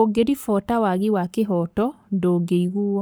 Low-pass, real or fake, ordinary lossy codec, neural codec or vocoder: none; fake; none; codec, 44.1 kHz, 7.8 kbps, Pupu-Codec